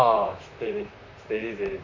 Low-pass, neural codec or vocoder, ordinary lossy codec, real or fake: 7.2 kHz; vocoder, 44.1 kHz, 128 mel bands, Pupu-Vocoder; MP3, 48 kbps; fake